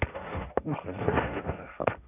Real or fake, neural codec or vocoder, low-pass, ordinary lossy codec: fake; codec, 16 kHz, 1.1 kbps, Voila-Tokenizer; 3.6 kHz; none